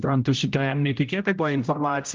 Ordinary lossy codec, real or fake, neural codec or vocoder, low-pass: Opus, 32 kbps; fake; codec, 16 kHz, 0.5 kbps, X-Codec, HuBERT features, trained on general audio; 7.2 kHz